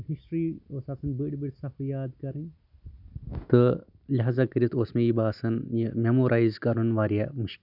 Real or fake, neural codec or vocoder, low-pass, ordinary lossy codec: real; none; 5.4 kHz; none